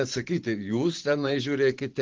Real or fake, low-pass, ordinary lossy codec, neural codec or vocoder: fake; 7.2 kHz; Opus, 16 kbps; vocoder, 44.1 kHz, 128 mel bands every 512 samples, BigVGAN v2